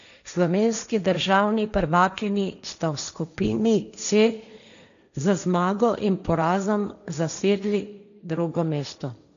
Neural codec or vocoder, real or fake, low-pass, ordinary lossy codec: codec, 16 kHz, 1.1 kbps, Voila-Tokenizer; fake; 7.2 kHz; none